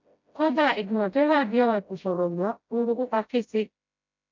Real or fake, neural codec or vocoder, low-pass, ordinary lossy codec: fake; codec, 16 kHz, 0.5 kbps, FreqCodec, smaller model; 7.2 kHz; MP3, 64 kbps